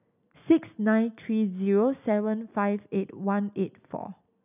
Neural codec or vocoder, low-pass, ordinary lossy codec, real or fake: none; 3.6 kHz; none; real